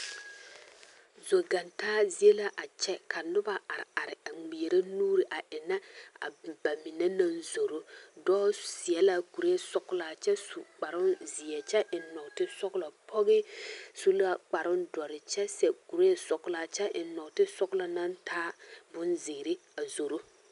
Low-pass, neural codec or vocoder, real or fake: 10.8 kHz; none; real